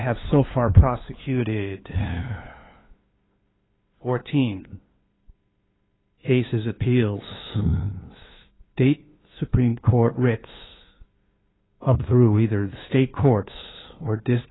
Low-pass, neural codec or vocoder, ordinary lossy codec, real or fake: 7.2 kHz; codec, 16 kHz, 2 kbps, FunCodec, trained on LibriTTS, 25 frames a second; AAC, 16 kbps; fake